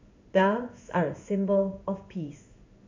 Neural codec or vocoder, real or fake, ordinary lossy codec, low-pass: codec, 16 kHz in and 24 kHz out, 1 kbps, XY-Tokenizer; fake; MP3, 64 kbps; 7.2 kHz